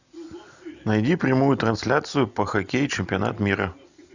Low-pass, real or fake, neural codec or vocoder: 7.2 kHz; real; none